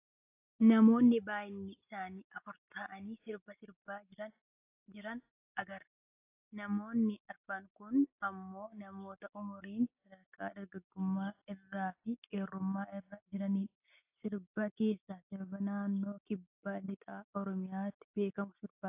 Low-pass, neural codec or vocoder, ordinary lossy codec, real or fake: 3.6 kHz; none; AAC, 32 kbps; real